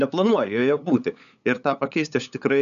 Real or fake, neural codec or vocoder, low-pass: fake; codec, 16 kHz, 8 kbps, FunCodec, trained on LibriTTS, 25 frames a second; 7.2 kHz